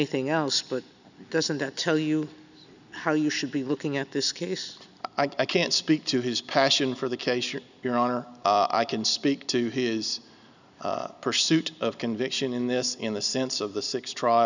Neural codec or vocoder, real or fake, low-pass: none; real; 7.2 kHz